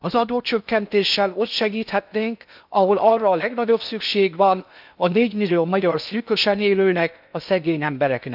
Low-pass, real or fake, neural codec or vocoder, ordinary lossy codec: 5.4 kHz; fake; codec, 16 kHz in and 24 kHz out, 0.6 kbps, FocalCodec, streaming, 2048 codes; none